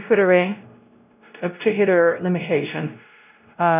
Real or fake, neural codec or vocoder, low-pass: fake; codec, 16 kHz, 0.5 kbps, X-Codec, WavLM features, trained on Multilingual LibriSpeech; 3.6 kHz